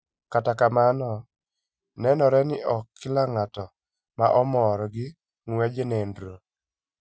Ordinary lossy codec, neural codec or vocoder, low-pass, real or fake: none; none; none; real